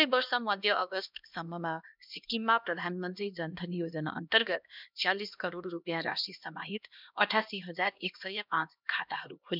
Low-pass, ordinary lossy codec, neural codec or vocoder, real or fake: 5.4 kHz; none; codec, 16 kHz, 1 kbps, X-Codec, HuBERT features, trained on LibriSpeech; fake